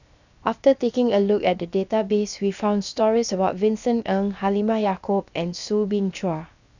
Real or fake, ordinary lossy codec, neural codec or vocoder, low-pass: fake; none; codec, 16 kHz, 0.7 kbps, FocalCodec; 7.2 kHz